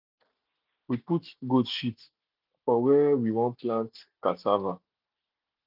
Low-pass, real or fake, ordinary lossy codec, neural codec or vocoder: 5.4 kHz; real; none; none